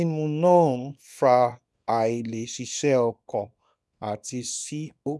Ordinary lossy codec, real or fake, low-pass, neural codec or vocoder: none; fake; none; codec, 24 kHz, 0.9 kbps, WavTokenizer, small release